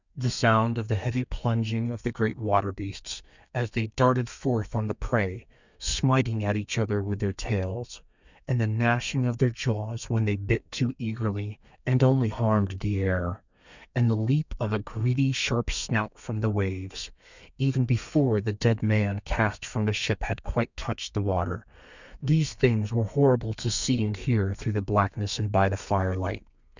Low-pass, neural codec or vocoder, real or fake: 7.2 kHz; codec, 32 kHz, 1.9 kbps, SNAC; fake